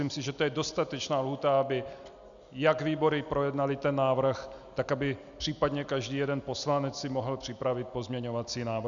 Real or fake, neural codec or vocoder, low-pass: real; none; 7.2 kHz